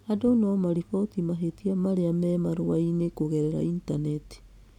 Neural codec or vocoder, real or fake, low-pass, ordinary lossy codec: none; real; 19.8 kHz; none